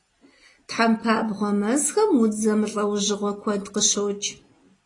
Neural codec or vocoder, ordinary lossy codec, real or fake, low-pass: none; AAC, 32 kbps; real; 10.8 kHz